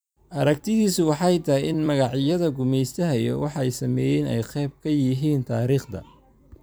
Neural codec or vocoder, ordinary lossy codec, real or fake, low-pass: vocoder, 44.1 kHz, 128 mel bands every 256 samples, BigVGAN v2; none; fake; none